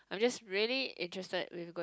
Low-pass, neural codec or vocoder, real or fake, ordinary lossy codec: none; none; real; none